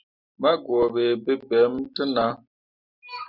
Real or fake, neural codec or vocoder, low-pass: real; none; 5.4 kHz